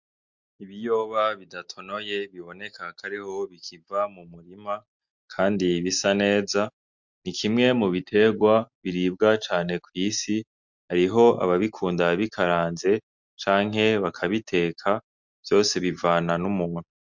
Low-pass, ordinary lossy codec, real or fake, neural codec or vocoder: 7.2 kHz; MP3, 64 kbps; real; none